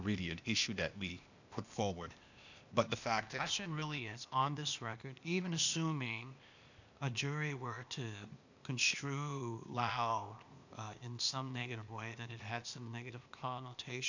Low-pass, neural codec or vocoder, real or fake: 7.2 kHz; codec, 16 kHz, 0.8 kbps, ZipCodec; fake